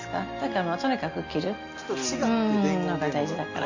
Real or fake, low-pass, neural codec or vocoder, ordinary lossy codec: real; 7.2 kHz; none; Opus, 64 kbps